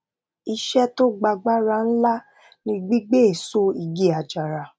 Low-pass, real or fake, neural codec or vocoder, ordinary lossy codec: none; real; none; none